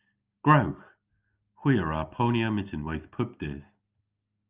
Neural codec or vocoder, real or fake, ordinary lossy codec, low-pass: none; real; Opus, 24 kbps; 3.6 kHz